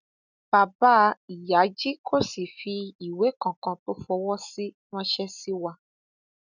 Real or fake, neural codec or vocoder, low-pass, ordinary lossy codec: real; none; 7.2 kHz; none